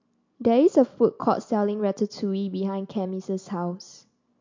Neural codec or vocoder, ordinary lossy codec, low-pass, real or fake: none; MP3, 48 kbps; 7.2 kHz; real